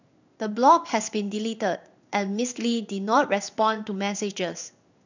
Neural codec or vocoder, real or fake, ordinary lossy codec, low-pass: codec, 16 kHz in and 24 kHz out, 1 kbps, XY-Tokenizer; fake; none; 7.2 kHz